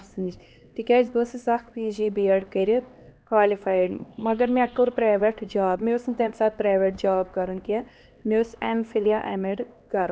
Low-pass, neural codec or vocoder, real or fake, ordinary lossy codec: none; codec, 16 kHz, 2 kbps, X-Codec, HuBERT features, trained on LibriSpeech; fake; none